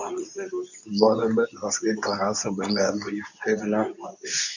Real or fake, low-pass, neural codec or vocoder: fake; 7.2 kHz; codec, 24 kHz, 0.9 kbps, WavTokenizer, medium speech release version 2